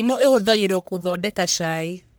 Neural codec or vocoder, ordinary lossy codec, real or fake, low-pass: codec, 44.1 kHz, 1.7 kbps, Pupu-Codec; none; fake; none